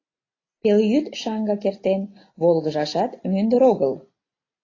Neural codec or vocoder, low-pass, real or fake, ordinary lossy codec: none; 7.2 kHz; real; AAC, 32 kbps